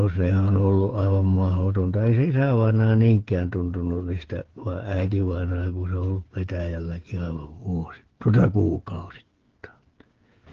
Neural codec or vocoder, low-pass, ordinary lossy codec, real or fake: codec, 16 kHz, 8 kbps, FreqCodec, smaller model; 7.2 kHz; Opus, 16 kbps; fake